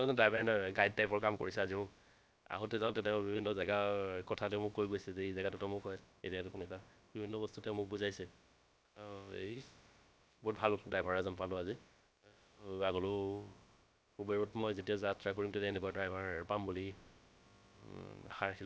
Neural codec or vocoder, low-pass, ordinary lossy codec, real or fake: codec, 16 kHz, about 1 kbps, DyCAST, with the encoder's durations; none; none; fake